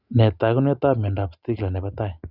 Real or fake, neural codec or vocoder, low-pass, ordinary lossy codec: real; none; 5.4 kHz; Opus, 64 kbps